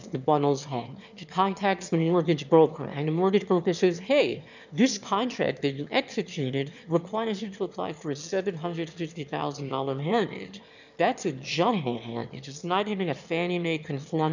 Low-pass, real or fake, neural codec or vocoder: 7.2 kHz; fake; autoencoder, 22.05 kHz, a latent of 192 numbers a frame, VITS, trained on one speaker